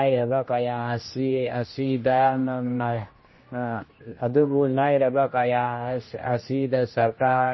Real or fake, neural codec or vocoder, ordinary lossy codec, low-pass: fake; codec, 16 kHz, 1 kbps, X-Codec, HuBERT features, trained on general audio; MP3, 24 kbps; 7.2 kHz